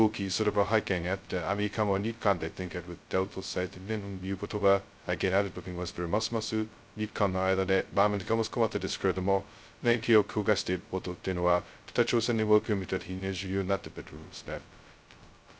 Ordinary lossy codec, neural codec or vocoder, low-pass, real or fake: none; codec, 16 kHz, 0.2 kbps, FocalCodec; none; fake